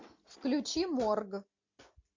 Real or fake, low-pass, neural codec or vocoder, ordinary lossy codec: real; 7.2 kHz; none; MP3, 48 kbps